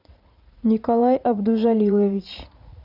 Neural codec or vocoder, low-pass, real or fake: vocoder, 44.1 kHz, 80 mel bands, Vocos; 5.4 kHz; fake